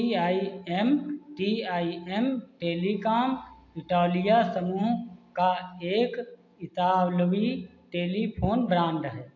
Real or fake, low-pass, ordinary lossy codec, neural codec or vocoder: real; 7.2 kHz; AAC, 48 kbps; none